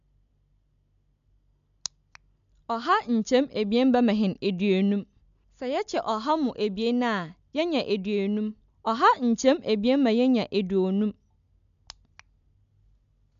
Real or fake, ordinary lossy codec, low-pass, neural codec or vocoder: real; MP3, 64 kbps; 7.2 kHz; none